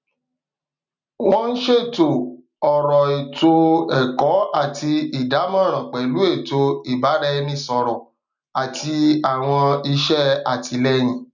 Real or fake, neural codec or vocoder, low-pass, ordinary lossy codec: real; none; 7.2 kHz; none